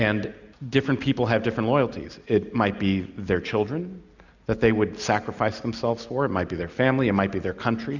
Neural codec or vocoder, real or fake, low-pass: none; real; 7.2 kHz